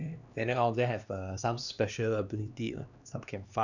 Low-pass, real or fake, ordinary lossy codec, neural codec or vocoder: 7.2 kHz; fake; none; codec, 16 kHz, 2 kbps, X-Codec, HuBERT features, trained on LibriSpeech